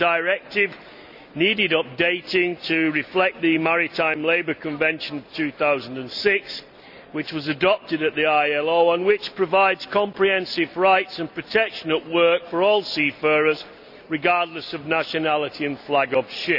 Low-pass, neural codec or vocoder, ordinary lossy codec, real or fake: 5.4 kHz; none; none; real